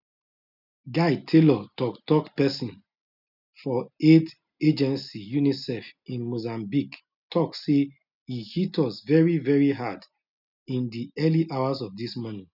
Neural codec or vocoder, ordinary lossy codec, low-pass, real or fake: none; none; 5.4 kHz; real